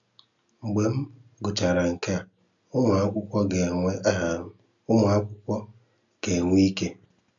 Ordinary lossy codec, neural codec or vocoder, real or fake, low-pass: none; none; real; 7.2 kHz